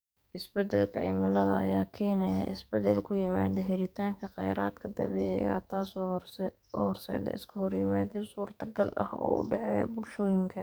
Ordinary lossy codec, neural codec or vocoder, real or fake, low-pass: none; codec, 44.1 kHz, 2.6 kbps, SNAC; fake; none